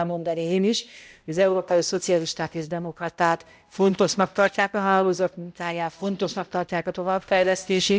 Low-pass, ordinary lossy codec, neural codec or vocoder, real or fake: none; none; codec, 16 kHz, 0.5 kbps, X-Codec, HuBERT features, trained on balanced general audio; fake